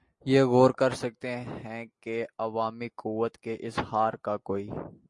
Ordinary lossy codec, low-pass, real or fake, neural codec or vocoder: MP3, 48 kbps; 10.8 kHz; real; none